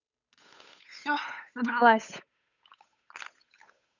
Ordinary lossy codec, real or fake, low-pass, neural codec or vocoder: none; fake; 7.2 kHz; codec, 16 kHz, 8 kbps, FunCodec, trained on Chinese and English, 25 frames a second